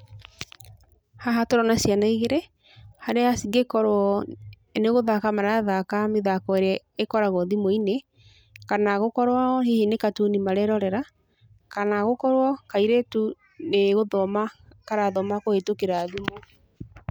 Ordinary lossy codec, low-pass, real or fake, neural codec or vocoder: none; none; real; none